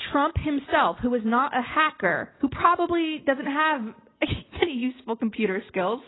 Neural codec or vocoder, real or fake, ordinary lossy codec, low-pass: none; real; AAC, 16 kbps; 7.2 kHz